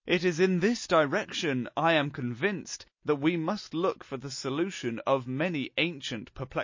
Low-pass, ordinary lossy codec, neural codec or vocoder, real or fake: 7.2 kHz; MP3, 32 kbps; none; real